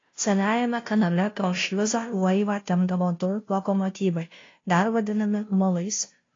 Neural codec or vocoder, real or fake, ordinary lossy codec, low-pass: codec, 16 kHz, 0.5 kbps, FunCodec, trained on Chinese and English, 25 frames a second; fake; AAC, 32 kbps; 7.2 kHz